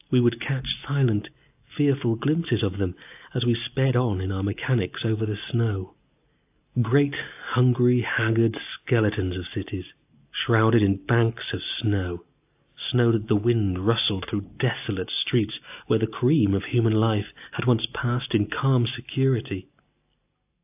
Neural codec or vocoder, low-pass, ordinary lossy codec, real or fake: none; 3.6 kHz; AAC, 32 kbps; real